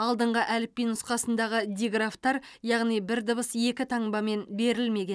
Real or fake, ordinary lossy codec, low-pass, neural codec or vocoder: real; none; none; none